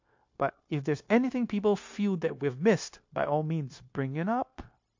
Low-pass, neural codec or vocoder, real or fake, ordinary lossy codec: 7.2 kHz; codec, 16 kHz, 0.9 kbps, LongCat-Audio-Codec; fake; MP3, 48 kbps